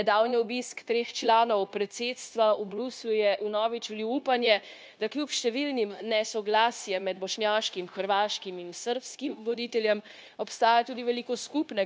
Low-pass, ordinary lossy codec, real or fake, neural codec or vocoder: none; none; fake; codec, 16 kHz, 0.9 kbps, LongCat-Audio-Codec